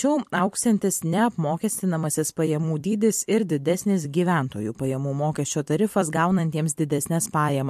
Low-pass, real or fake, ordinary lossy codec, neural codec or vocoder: 14.4 kHz; fake; MP3, 64 kbps; vocoder, 44.1 kHz, 128 mel bands every 256 samples, BigVGAN v2